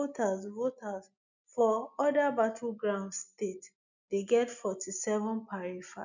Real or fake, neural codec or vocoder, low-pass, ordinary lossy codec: real; none; 7.2 kHz; none